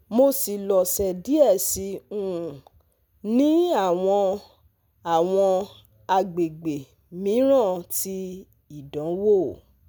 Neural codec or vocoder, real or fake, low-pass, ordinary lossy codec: none; real; none; none